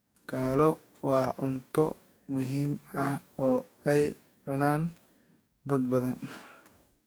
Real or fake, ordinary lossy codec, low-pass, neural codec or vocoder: fake; none; none; codec, 44.1 kHz, 2.6 kbps, DAC